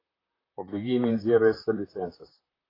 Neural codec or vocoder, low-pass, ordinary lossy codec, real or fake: vocoder, 44.1 kHz, 128 mel bands, Pupu-Vocoder; 5.4 kHz; AAC, 24 kbps; fake